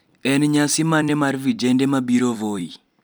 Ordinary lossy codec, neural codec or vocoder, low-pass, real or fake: none; vocoder, 44.1 kHz, 128 mel bands every 256 samples, BigVGAN v2; none; fake